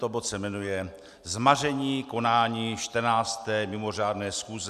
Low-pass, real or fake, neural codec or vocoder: 14.4 kHz; real; none